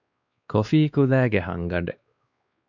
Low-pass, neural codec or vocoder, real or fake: 7.2 kHz; codec, 16 kHz, 2 kbps, X-Codec, WavLM features, trained on Multilingual LibriSpeech; fake